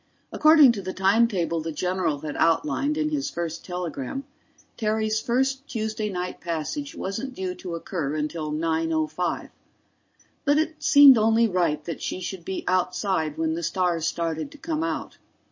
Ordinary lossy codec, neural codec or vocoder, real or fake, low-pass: MP3, 32 kbps; none; real; 7.2 kHz